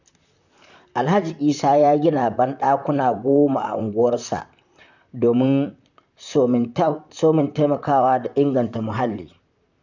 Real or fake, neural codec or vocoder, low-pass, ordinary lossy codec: fake; vocoder, 44.1 kHz, 128 mel bands, Pupu-Vocoder; 7.2 kHz; none